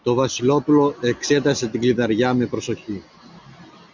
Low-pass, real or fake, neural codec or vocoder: 7.2 kHz; real; none